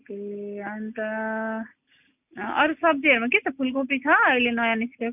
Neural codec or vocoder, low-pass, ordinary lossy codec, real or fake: none; 3.6 kHz; none; real